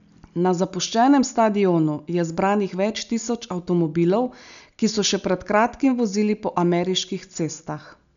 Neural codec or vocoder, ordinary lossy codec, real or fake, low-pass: none; none; real; 7.2 kHz